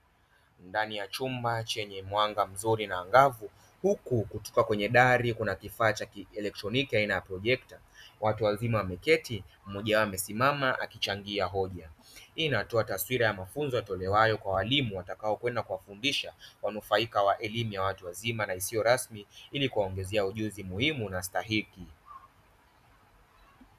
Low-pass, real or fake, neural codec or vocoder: 14.4 kHz; real; none